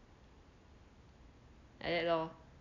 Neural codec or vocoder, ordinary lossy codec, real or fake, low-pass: none; none; real; 7.2 kHz